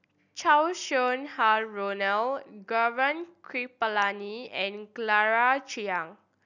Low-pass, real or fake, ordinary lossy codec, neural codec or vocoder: 7.2 kHz; real; none; none